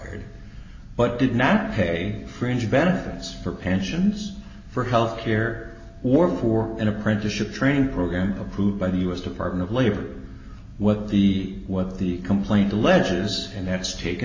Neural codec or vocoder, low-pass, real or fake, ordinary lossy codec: none; 7.2 kHz; real; MP3, 32 kbps